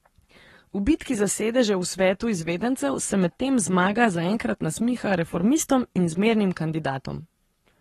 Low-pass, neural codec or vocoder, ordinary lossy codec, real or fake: 19.8 kHz; codec, 44.1 kHz, 7.8 kbps, Pupu-Codec; AAC, 32 kbps; fake